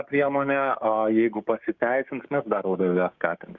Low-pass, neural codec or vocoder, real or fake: 7.2 kHz; codec, 16 kHz, 6 kbps, DAC; fake